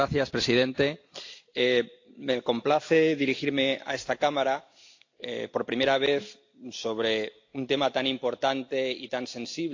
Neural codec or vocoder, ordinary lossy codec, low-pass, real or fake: none; AAC, 48 kbps; 7.2 kHz; real